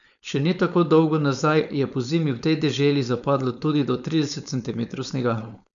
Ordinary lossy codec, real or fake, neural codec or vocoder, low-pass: none; fake; codec, 16 kHz, 4.8 kbps, FACodec; 7.2 kHz